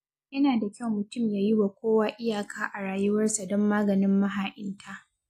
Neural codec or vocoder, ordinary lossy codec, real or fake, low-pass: none; AAC, 64 kbps; real; 14.4 kHz